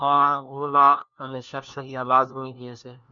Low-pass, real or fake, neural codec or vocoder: 7.2 kHz; fake; codec, 16 kHz, 1 kbps, FunCodec, trained on LibriTTS, 50 frames a second